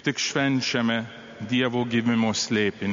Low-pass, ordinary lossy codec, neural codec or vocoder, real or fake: 7.2 kHz; MP3, 48 kbps; none; real